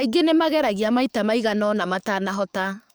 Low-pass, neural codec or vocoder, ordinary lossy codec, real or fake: none; codec, 44.1 kHz, 7.8 kbps, DAC; none; fake